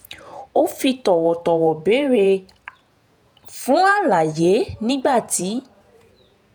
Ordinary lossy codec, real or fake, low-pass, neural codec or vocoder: none; fake; none; vocoder, 48 kHz, 128 mel bands, Vocos